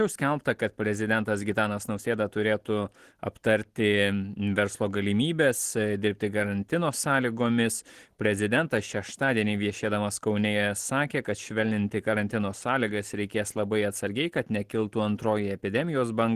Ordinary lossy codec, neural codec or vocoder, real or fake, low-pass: Opus, 16 kbps; none; real; 14.4 kHz